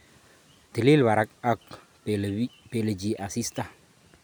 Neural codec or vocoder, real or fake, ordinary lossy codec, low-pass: none; real; none; none